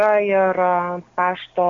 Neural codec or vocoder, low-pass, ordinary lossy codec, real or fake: none; 7.2 kHz; MP3, 48 kbps; real